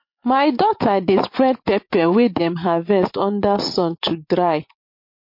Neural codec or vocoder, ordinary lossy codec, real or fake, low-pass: vocoder, 44.1 kHz, 80 mel bands, Vocos; MP3, 32 kbps; fake; 5.4 kHz